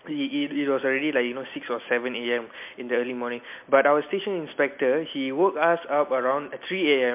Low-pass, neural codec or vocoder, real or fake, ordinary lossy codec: 3.6 kHz; none; real; MP3, 32 kbps